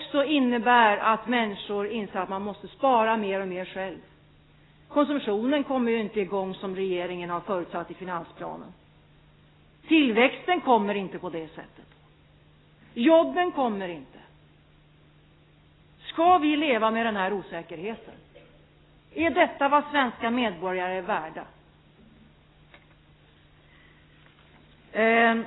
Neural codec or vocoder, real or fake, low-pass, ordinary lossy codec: none; real; 7.2 kHz; AAC, 16 kbps